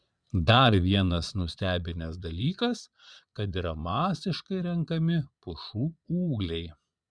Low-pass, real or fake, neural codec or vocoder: 9.9 kHz; fake; vocoder, 22.05 kHz, 80 mel bands, Vocos